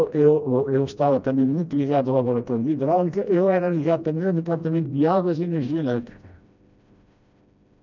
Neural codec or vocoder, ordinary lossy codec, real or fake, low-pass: codec, 16 kHz, 1 kbps, FreqCodec, smaller model; none; fake; 7.2 kHz